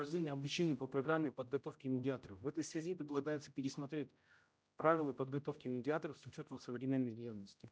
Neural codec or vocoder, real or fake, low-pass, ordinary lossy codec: codec, 16 kHz, 0.5 kbps, X-Codec, HuBERT features, trained on general audio; fake; none; none